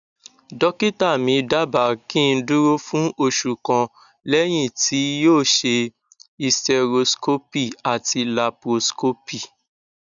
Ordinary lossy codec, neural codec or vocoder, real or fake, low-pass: none; none; real; 7.2 kHz